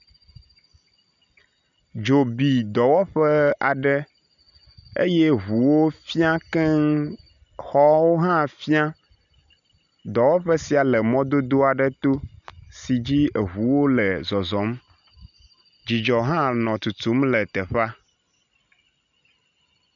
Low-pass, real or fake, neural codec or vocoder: 7.2 kHz; real; none